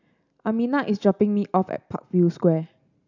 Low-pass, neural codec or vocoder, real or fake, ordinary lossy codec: 7.2 kHz; none; real; none